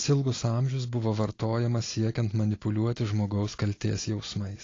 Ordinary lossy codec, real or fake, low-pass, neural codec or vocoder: AAC, 32 kbps; real; 7.2 kHz; none